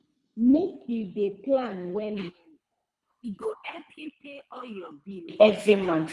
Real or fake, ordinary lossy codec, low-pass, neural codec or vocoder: fake; none; none; codec, 24 kHz, 3 kbps, HILCodec